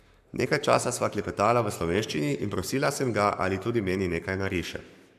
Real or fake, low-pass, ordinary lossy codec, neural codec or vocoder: fake; 14.4 kHz; AAC, 96 kbps; codec, 44.1 kHz, 7.8 kbps, Pupu-Codec